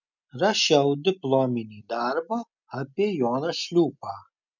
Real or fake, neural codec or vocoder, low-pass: real; none; 7.2 kHz